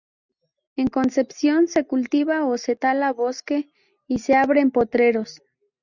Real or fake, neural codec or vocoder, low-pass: real; none; 7.2 kHz